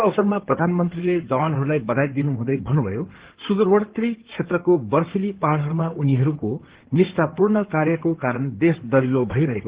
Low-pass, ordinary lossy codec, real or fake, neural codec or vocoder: 3.6 kHz; Opus, 16 kbps; fake; codec, 16 kHz in and 24 kHz out, 2.2 kbps, FireRedTTS-2 codec